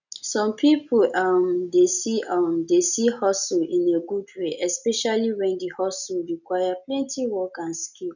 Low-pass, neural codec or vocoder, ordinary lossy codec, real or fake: 7.2 kHz; none; none; real